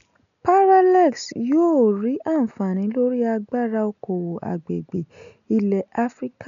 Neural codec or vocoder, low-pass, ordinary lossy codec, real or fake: none; 7.2 kHz; none; real